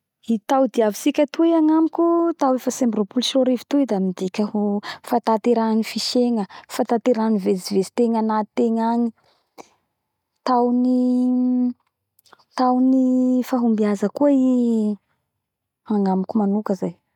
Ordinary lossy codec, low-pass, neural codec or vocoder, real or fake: none; 19.8 kHz; none; real